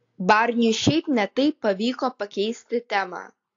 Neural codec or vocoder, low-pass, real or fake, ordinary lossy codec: none; 7.2 kHz; real; AAC, 48 kbps